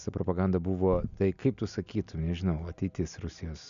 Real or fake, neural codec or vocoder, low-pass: real; none; 7.2 kHz